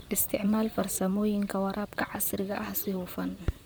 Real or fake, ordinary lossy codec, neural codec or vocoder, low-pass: fake; none; vocoder, 44.1 kHz, 128 mel bands, Pupu-Vocoder; none